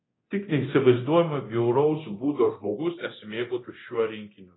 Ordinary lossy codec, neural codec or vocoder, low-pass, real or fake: AAC, 16 kbps; codec, 24 kHz, 0.9 kbps, DualCodec; 7.2 kHz; fake